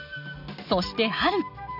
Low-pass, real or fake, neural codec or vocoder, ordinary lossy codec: 5.4 kHz; real; none; none